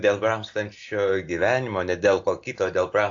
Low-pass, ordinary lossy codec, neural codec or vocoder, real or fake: 7.2 kHz; AAC, 48 kbps; none; real